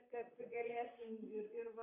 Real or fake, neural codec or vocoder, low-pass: fake; vocoder, 44.1 kHz, 128 mel bands, Pupu-Vocoder; 3.6 kHz